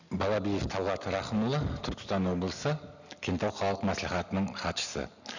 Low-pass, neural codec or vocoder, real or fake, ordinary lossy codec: 7.2 kHz; none; real; none